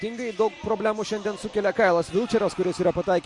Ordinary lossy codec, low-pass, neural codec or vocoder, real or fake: MP3, 48 kbps; 10.8 kHz; none; real